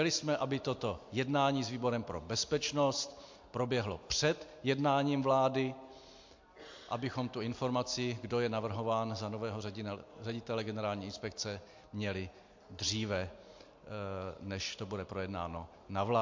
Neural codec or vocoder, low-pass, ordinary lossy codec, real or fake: none; 7.2 kHz; MP3, 48 kbps; real